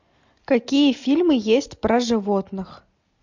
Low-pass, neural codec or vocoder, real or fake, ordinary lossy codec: 7.2 kHz; none; real; MP3, 64 kbps